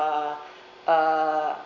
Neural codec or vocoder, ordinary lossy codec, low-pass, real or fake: none; none; 7.2 kHz; real